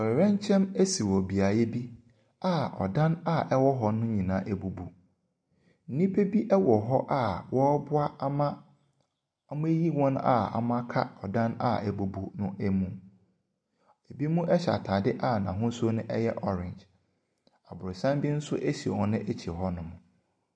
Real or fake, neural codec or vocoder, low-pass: real; none; 9.9 kHz